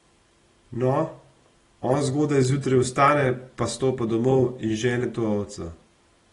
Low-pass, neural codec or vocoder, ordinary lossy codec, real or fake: 10.8 kHz; none; AAC, 32 kbps; real